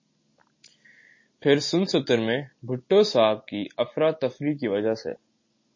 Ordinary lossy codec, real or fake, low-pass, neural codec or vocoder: MP3, 32 kbps; real; 7.2 kHz; none